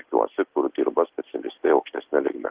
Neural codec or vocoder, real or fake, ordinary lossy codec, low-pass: none; real; Opus, 16 kbps; 3.6 kHz